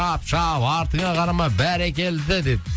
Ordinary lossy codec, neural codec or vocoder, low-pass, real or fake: none; none; none; real